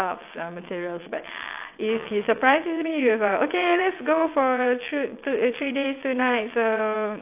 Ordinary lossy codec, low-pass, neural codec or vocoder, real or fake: AAC, 32 kbps; 3.6 kHz; vocoder, 22.05 kHz, 80 mel bands, WaveNeXt; fake